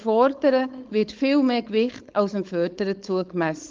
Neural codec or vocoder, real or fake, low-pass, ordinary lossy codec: codec, 16 kHz, 4.8 kbps, FACodec; fake; 7.2 kHz; Opus, 32 kbps